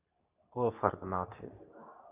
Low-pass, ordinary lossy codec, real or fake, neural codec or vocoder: 3.6 kHz; Opus, 64 kbps; fake; codec, 24 kHz, 0.9 kbps, WavTokenizer, medium speech release version 2